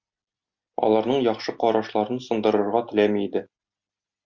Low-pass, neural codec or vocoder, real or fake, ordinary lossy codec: 7.2 kHz; none; real; Opus, 64 kbps